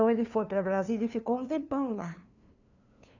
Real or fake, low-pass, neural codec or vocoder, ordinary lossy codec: fake; 7.2 kHz; codec, 16 kHz, 2 kbps, FunCodec, trained on LibriTTS, 25 frames a second; none